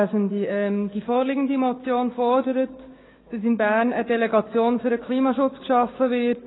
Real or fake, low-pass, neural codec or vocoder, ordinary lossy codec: fake; 7.2 kHz; codec, 16 kHz, 6 kbps, DAC; AAC, 16 kbps